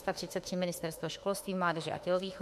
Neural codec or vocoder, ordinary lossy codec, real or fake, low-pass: autoencoder, 48 kHz, 32 numbers a frame, DAC-VAE, trained on Japanese speech; MP3, 64 kbps; fake; 14.4 kHz